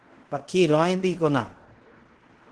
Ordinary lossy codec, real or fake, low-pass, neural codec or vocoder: Opus, 16 kbps; fake; 10.8 kHz; codec, 16 kHz in and 24 kHz out, 0.9 kbps, LongCat-Audio-Codec, fine tuned four codebook decoder